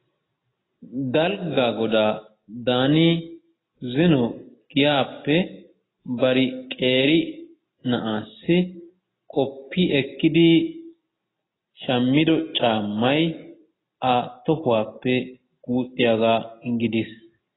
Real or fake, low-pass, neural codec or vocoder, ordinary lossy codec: real; 7.2 kHz; none; AAC, 16 kbps